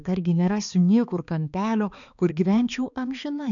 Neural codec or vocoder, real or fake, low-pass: codec, 16 kHz, 2 kbps, X-Codec, HuBERT features, trained on balanced general audio; fake; 7.2 kHz